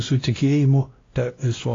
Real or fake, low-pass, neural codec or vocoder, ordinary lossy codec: fake; 7.2 kHz; codec, 16 kHz, 1 kbps, X-Codec, WavLM features, trained on Multilingual LibriSpeech; AAC, 32 kbps